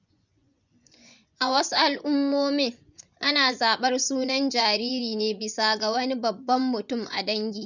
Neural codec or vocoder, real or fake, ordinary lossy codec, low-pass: vocoder, 24 kHz, 100 mel bands, Vocos; fake; none; 7.2 kHz